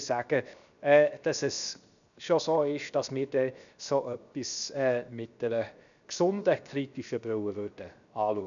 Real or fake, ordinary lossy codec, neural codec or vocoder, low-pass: fake; none; codec, 16 kHz, 0.7 kbps, FocalCodec; 7.2 kHz